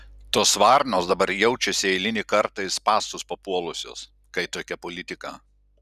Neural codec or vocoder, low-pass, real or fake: none; 14.4 kHz; real